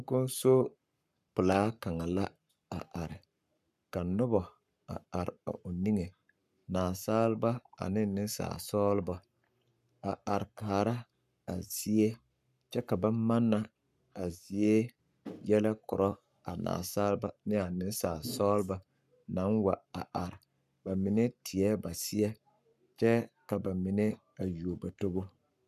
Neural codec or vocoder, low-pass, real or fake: codec, 44.1 kHz, 7.8 kbps, Pupu-Codec; 14.4 kHz; fake